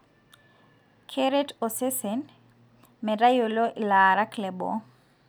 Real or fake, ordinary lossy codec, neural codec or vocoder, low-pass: real; none; none; none